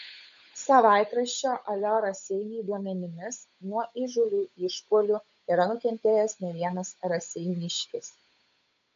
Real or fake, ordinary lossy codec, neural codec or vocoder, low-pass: fake; MP3, 48 kbps; codec, 16 kHz, 8 kbps, FunCodec, trained on Chinese and English, 25 frames a second; 7.2 kHz